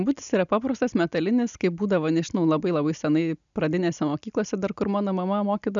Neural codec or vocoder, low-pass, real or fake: none; 7.2 kHz; real